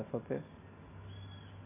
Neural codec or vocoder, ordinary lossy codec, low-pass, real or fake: none; none; 3.6 kHz; real